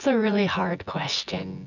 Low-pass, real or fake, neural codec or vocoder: 7.2 kHz; fake; vocoder, 24 kHz, 100 mel bands, Vocos